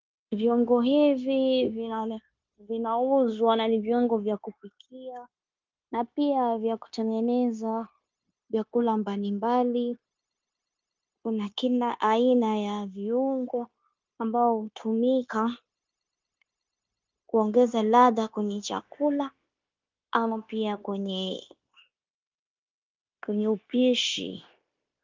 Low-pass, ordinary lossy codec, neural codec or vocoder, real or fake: 7.2 kHz; Opus, 24 kbps; codec, 16 kHz, 0.9 kbps, LongCat-Audio-Codec; fake